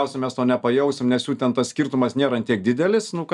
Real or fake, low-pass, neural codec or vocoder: real; 10.8 kHz; none